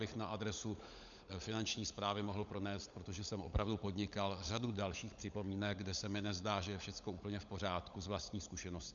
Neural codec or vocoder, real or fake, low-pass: codec, 16 kHz, 16 kbps, FunCodec, trained on LibriTTS, 50 frames a second; fake; 7.2 kHz